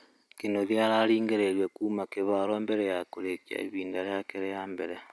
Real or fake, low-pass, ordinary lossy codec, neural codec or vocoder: real; none; none; none